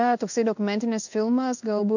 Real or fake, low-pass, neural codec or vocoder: fake; 7.2 kHz; codec, 16 kHz in and 24 kHz out, 1 kbps, XY-Tokenizer